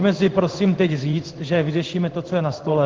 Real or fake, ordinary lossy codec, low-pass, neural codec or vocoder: fake; Opus, 16 kbps; 7.2 kHz; codec, 16 kHz in and 24 kHz out, 1 kbps, XY-Tokenizer